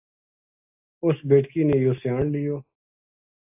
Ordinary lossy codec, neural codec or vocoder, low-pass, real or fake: AAC, 32 kbps; none; 3.6 kHz; real